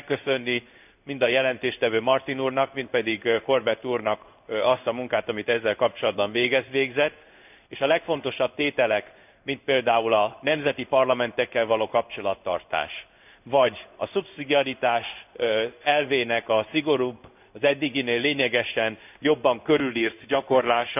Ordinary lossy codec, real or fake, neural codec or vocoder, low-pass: none; fake; codec, 16 kHz in and 24 kHz out, 1 kbps, XY-Tokenizer; 3.6 kHz